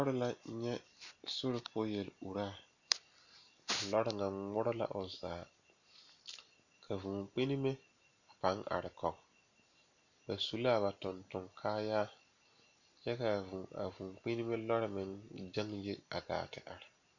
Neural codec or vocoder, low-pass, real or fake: none; 7.2 kHz; real